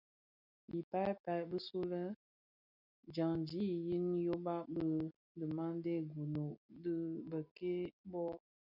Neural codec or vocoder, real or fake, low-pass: none; real; 5.4 kHz